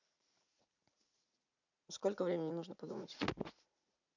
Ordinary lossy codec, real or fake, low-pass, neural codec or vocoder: none; fake; 7.2 kHz; vocoder, 44.1 kHz, 80 mel bands, Vocos